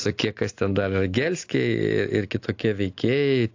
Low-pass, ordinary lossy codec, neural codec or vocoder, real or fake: 7.2 kHz; AAC, 48 kbps; none; real